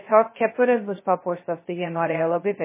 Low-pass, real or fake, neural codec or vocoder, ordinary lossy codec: 3.6 kHz; fake; codec, 16 kHz, 0.2 kbps, FocalCodec; MP3, 16 kbps